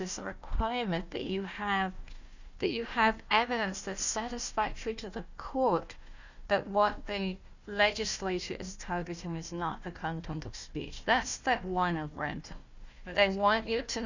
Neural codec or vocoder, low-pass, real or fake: codec, 16 kHz, 1 kbps, FunCodec, trained on Chinese and English, 50 frames a second; 7.2 kHz; fake